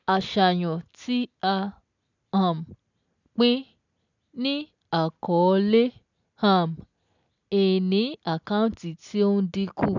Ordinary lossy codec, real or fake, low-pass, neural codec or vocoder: none; real; 7.2 kHz; none